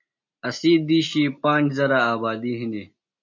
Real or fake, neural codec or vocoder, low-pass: real; none; 7.2 kHz